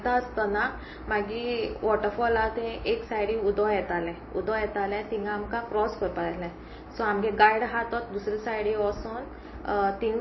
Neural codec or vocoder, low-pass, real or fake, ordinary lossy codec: none; 7.2 kHz; real; MP3, 24 kbps